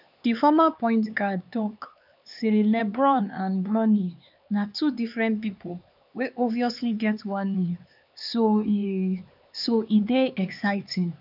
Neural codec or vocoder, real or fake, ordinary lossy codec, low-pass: codec, 16 kHz, 4 kbps, X-Codec, HuBERT features, trained on LibriSpeech; fake; none; 5.4 kHz